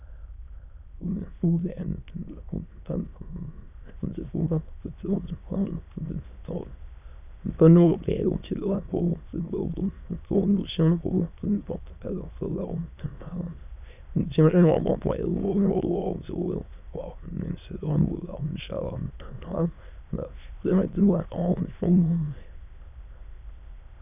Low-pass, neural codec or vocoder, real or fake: 3.6 kHz; autoencoder, 22.05 kHz, a latent of 192 numbers a frame, VITS, trained on many speakers; fake